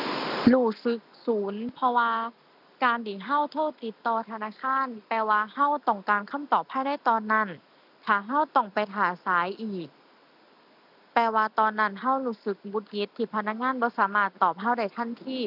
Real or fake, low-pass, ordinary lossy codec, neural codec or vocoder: real; 5.4 kHz; none; none